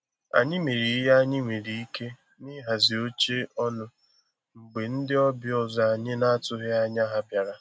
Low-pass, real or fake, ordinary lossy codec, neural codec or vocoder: none; real; none; none